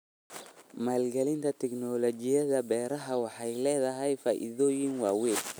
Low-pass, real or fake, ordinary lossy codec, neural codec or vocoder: none; real; none; none